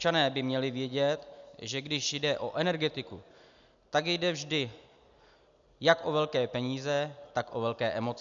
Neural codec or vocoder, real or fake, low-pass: none; real; 7.2 kHz